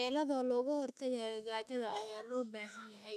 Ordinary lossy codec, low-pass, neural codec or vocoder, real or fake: none; 14.4 kHz; autoencoder, 48 kHz, 32 numbers a frame, DAC-VAE, trained on Japanese speech; fake